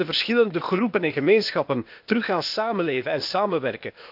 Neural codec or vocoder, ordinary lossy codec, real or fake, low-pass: codec, 16 kHz, 0.8 kbps, ZipCodec; none; fake; 5.4 kHz